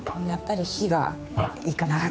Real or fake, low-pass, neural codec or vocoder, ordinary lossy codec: fake; none; codec, 16 kHz, 4 kbps, X-Codec, HuBERT features, trained on general audio; none